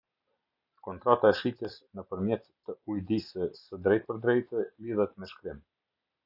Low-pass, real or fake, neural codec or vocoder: 5.4 kHz; real; none